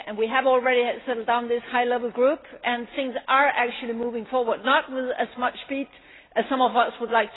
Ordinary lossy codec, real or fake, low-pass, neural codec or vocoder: AAC, 16 kbps; real; 7.2 kHz; none